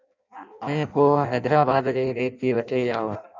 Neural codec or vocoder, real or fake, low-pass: codec, 16 kHz in and 24 kHz out, 0.6 kbps, FireRedTTS-2 codec; fake; 7.2 kHz